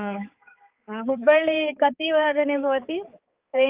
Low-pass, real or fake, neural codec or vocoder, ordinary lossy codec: 3.6 kHz; fake; codec, 16 kHz, 4 kbps, X-Codec, HuBERT features, trained on balanced general audio; Opus, 24 kbps